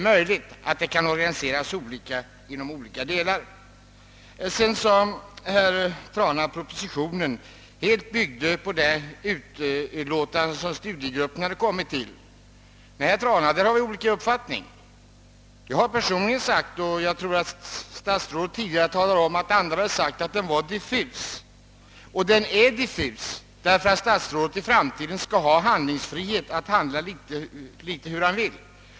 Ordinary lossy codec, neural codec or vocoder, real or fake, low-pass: none; none; real; none